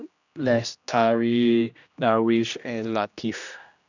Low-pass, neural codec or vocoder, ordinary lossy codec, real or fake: 7.2 kHz; codec, 16 kHz, 1 kbps, X-Codec, HuBERT features, trained on general audio; none; fake